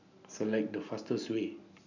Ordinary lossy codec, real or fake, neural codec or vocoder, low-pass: none; real; none; 7.2 kHz